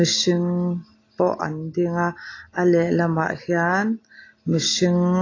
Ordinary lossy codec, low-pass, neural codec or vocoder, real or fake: AAC, 32 kbps; 7.2 kHz; none; real